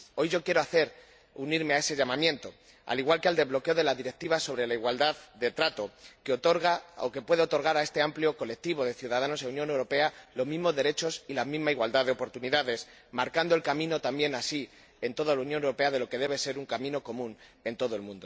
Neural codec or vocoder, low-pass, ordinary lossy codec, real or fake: none; none; none; real